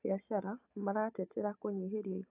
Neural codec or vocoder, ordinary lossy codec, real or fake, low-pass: none; AAC, 24 kbps; real; 3.6 kHz